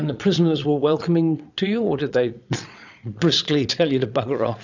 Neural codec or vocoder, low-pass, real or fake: vocoder, 22.05 kHz, 80 mel bands, Vocos; 7.2 kHz; fake